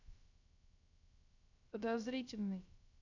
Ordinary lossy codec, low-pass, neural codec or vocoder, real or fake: none; 7.2 kHz; codec, 16 kHz, 0.3 kbps, FocalCodec; fake